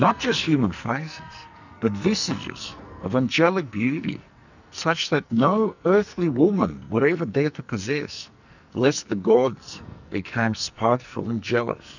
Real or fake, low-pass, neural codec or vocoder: fake; 7.2 kHz; codec, 44.1 kHz, 2.6 kbps, SNAC